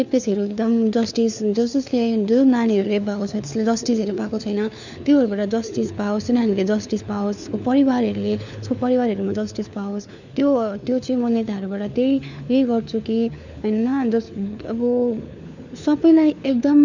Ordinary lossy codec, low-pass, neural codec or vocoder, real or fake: none; 7.2 kHz; codec, 16 kHz, 4 kbps, FunCodec, trained on LibriTTS, 50 frames a second; fake